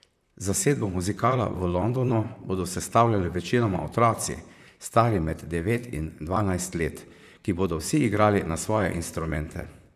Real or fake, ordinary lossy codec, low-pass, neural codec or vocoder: fake; none; 14.4 kHz; vocoder, 44.1 kHz, 128 mel bands, Pupu-Vocoder